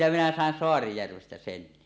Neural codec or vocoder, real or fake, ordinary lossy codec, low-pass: none; real; none; none